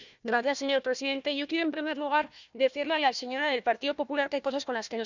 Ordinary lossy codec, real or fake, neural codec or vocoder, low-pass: none; fake; codec, 16 kHz, 1 kbps, FunCodec, trained on Chinese and English, 50 frames a second; 7.2 kHz